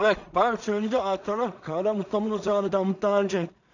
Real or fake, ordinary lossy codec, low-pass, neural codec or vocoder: fake; none; 7.2 kHz; codec, 16 kHz in and 24 kHz out, 0.4 kbps, LongCat-Audio-Codec, two codebook decoder